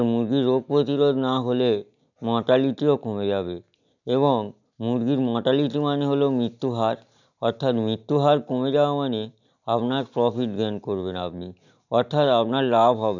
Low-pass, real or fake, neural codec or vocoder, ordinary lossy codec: 7.2 kHz; real; none; none